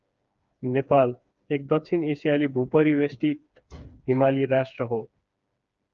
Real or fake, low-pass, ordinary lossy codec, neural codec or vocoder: fake; 7.2 kHz; Opus, 24 kbps; codec, 16 kHz, 4 kbps, FreqCodec, smaller model